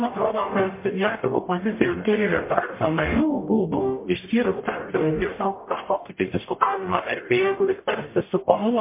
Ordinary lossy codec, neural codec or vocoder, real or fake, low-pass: MP3, 24 kbps; codec, 44.1 kHz, 0.9 kbps, DAC; fake; 3.6 kHz